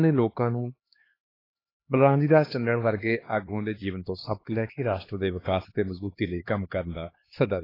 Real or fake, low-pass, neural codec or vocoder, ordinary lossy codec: fake; 5.4 kHz; codec, 16 kHz, 2 kbps, X-Codec, HuBERT features, trained on LibriSpeech; AAC, 24 kbps